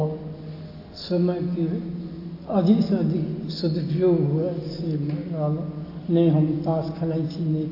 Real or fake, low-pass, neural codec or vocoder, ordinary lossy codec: real; 5.4 kHz; none; AAC, 32 kbps